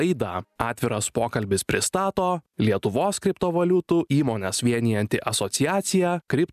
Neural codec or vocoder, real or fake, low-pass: none; real; 14.4 kHz